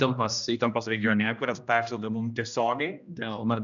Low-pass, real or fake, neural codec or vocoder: 7.2 kHz; fake; codec, 16 kHz, 1 kbps, X-Codec, HuBERT features, trained on general audio